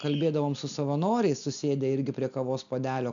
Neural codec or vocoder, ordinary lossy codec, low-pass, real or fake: none; MP3, 96 kbps; 7.2 kHz; real